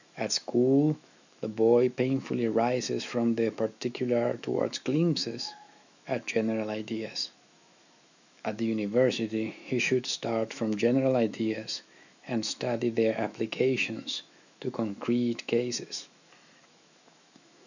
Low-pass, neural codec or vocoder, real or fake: 7.2 kHz; autoencoder, 48 kHz, 128 numbers a frame, DAC-VAE, trained on Japanese speech; fake